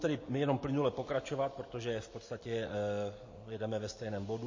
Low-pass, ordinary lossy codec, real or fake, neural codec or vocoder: 7.2 kHz; MP3, 32 kbps; real; none